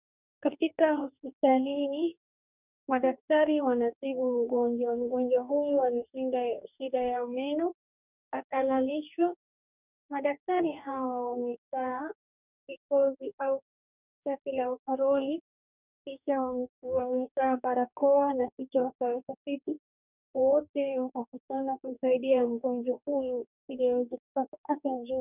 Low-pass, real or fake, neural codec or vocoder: 3.6 kHz; fake; codec, 44.1 kHz, 2.6 kbps, DAC